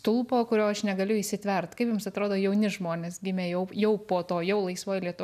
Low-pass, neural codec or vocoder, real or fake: 14.4 kHz; none; real